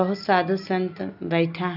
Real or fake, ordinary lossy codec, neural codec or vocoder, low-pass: real; none; none; 5.4 kHz